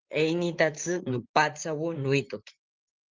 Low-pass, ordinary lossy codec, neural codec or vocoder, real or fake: 7.2 kHz; Opus, 32 kbps; vocoder, 44.1 kHz, 128 mel bands, Pupu-Vocoder; fake